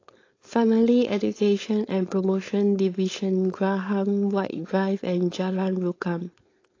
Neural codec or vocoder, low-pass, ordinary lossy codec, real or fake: codec, 16 kHz, 4.8 kbps, FACodec; 7.2 kHz; AAC, 32 kbps; fake